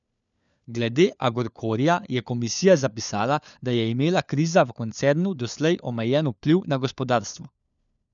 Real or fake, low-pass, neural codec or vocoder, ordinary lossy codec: fake; 7.2 kHz; codec, 16 kHz, 4 kbps, FunCodec, trained on LibriTTS, 50 frames a second; none